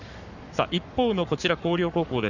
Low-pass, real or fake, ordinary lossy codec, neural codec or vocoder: 7.2 kHz; fake; none; codec, 44.1 kHz, 7.8 kbps, Pupu-Codec